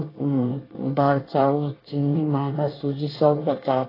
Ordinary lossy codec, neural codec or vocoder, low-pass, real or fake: AAC, 24 kbps; codec, 24 kHz, 1 kbps, SNAC; 5.4 kHz; fake